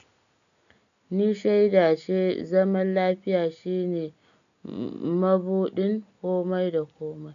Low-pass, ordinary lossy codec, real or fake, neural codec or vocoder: 7.2 kHz; none; real; none